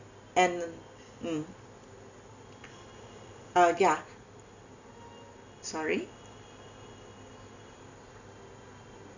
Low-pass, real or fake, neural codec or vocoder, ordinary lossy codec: 7.2 kHz; real; none; none